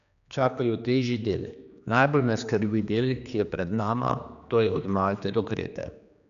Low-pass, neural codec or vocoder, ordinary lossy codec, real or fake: 7.2 kHz; codec, 16 kHz, 2 kbps, X-Codec, HuBERT features, trained on general audio; none; fake